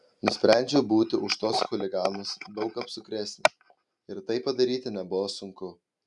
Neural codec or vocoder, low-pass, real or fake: none; 10.8 kHz; real